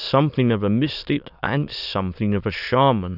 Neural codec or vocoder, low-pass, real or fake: autoencoder, 22.05 kHz, a latent of 192 numbers a frame, VITS, trained on many speakers; 5.4 kHz; fake